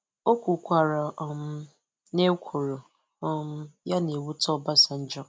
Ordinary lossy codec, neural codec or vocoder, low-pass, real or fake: none; none; none; real